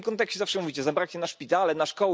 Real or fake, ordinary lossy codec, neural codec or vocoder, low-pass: real; none; none; none